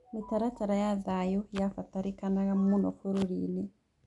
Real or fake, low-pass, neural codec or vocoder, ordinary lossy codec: real; 10.8 kHz; none; none